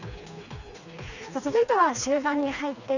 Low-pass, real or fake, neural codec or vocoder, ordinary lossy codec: 7.2 kHz; fake; codec, 16 kHz, 2 kbps, FreqCodec, smaller model; none